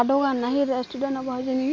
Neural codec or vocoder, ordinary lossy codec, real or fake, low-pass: none; none; real; none